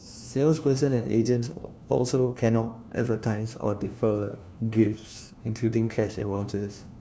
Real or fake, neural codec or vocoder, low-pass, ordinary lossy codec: fake; codec, 16 kHz, 1 kbps, FunCodec, trained on LibriTTS, 50 frames a second; none; none